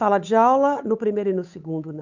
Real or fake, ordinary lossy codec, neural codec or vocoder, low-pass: fake; none; codec, 16 kHz, 8 kbps, FunCodec, trained on Chinese and English, 25 frames a second; 7.2 kHz